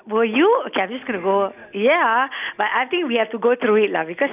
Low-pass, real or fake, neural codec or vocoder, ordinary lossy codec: 3.6 kHz; real; none; none